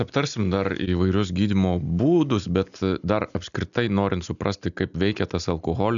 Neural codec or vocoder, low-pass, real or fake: none; 7.2 kHz; real